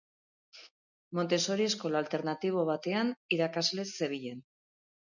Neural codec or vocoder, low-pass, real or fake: none; 7.2 kHz; real